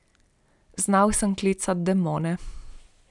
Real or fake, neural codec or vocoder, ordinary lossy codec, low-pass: real; none; none; 10.8 kHz